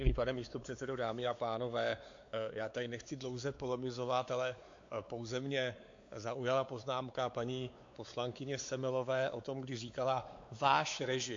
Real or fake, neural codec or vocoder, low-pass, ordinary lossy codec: fake; codec, 16 kHz, 4 kbps, X-Codec, WavLM features, trained on Multilingual LibriSpeech; 7.2 kHz; AAC, 48 kbps